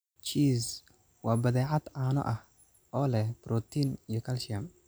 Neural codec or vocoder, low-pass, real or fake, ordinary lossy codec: none; none; real; none